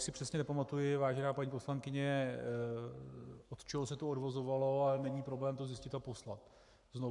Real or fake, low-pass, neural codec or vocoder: fake; 10.8 kHz; codec, 44.1 kHz, 7.8 kbps, Pupu-Codec